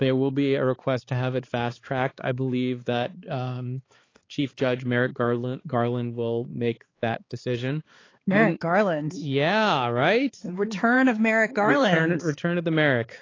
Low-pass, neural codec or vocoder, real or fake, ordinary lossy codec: 7.2 kHz; codec, 16 kHz, 4 kbps, X-Codec, HuBERT features, trained on balanced general audio; fake; AAC, 32 kbps